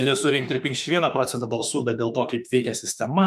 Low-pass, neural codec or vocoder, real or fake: 14.4 kHz; autoencoder, 48 kHz, 32 numbers a frame, DAC-VAE, trained on Japanese speech; fake